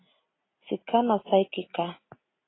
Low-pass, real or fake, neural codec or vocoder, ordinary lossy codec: 7.2 kHz; real; none; AAC, 16 kbps